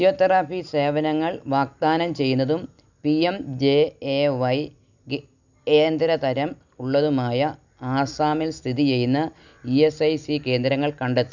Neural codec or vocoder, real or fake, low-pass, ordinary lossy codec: none; real; 7.2 kHz; none